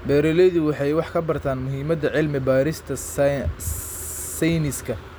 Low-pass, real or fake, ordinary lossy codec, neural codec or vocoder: none; real; none; none